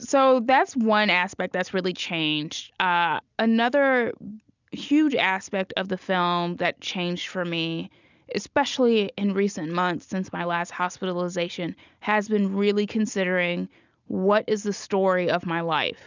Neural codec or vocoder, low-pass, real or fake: none; 7.2 kHz; real